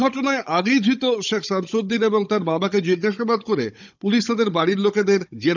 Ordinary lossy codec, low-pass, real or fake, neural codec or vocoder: none; 7.2 kHz; fake; codec, 16 kHz, 16 kbps, FunCodec, trained on Chinese and English, 50 frames a second